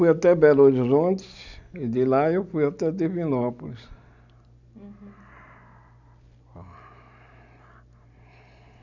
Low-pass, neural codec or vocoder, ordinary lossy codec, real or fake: 7.2 kHz; none; none; real